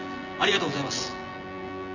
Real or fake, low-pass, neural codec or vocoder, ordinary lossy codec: fake; 7.2 kHz; vocoder, 24 kHz, 100 mel bands, Vocos; none